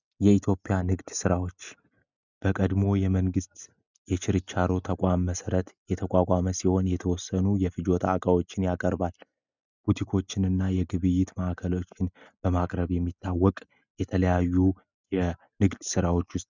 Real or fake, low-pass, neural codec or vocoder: real; 7.2 kHz; none